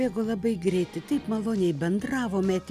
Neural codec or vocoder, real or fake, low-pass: none; real; 14.4 kHz